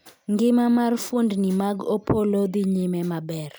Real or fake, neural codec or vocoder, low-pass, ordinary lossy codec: real; none; none; none